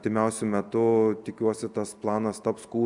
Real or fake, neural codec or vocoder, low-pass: real; none; 10.8 kHz